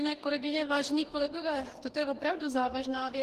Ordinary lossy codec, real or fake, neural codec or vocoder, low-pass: Opus, 16 kbps; fake; codec, 44.1 kHz, 2.6 kbps, DAC; 14.4 kHz